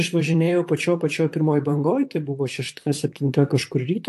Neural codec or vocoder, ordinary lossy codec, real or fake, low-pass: vocoder, 44.1 kHz, 128 mel bands, Pupu-Vocoder; AAC, 64 kbps; fake; 14.4 kHz